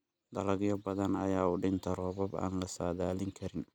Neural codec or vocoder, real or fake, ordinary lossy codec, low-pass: none; real; none; 19.8 kHz